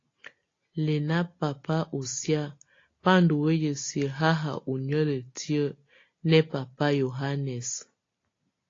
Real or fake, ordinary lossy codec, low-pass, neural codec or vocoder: real; AAC, 32 kbps; 7.2 kHz; none